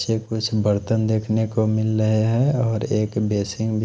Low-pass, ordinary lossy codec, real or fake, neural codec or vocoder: none; none; real; none